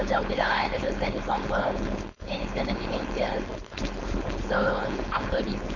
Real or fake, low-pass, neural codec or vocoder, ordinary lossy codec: fake; 7.2 kHz; codec, 16 kHz, 4.8 kbps, FACodec; none